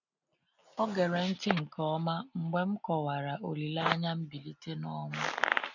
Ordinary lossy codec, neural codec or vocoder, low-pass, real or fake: none; none; 7.2 kHz; real